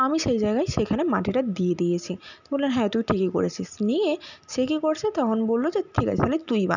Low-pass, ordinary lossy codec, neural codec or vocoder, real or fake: 7.2 kHz; none; none; real